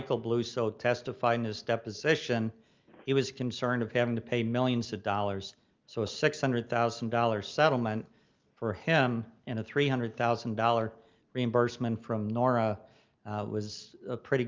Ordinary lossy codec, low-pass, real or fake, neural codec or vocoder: Opus, 24 kbps; 7.2 kHz; real; none